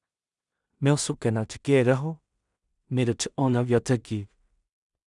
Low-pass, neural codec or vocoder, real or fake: 10.8 kHz; codec, 16 kHz in and 24 kHz out, 0.4 kbps, LongCat-Audio-Codec, two codebook decoder; fake